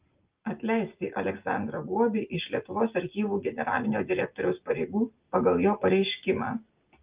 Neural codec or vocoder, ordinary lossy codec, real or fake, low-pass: none; Opus, 24 kbps; real; 3.6 kHz